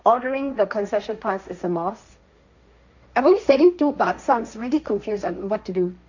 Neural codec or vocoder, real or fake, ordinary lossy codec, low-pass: codec, 16 kHz, 1.1 kbps, Voila-Tokenizer; fake; none; 7.2 kHz